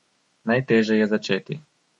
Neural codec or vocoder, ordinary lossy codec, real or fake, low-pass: autoencoder, 48 kHz, 128 numbers a frame, DAC-VAE, trained on Japanese speech; MP3, 48 kbps; fake; 19.8 kHz